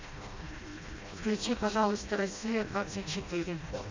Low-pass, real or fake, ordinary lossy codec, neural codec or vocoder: 7.2 kHz; fake; MP3, 64 kbps; codec, 16 kHz, 1 kbps, FreqCodec, smaller model